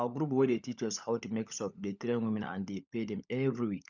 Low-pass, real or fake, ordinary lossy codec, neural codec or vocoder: 7.2 kHz; fake; none; codec, 16 kHz, 16 kbps, FunCodec, trained on LibriTTS, 50 frames a second